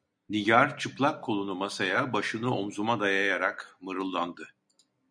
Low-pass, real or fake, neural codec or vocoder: 9.9 kHz; real; none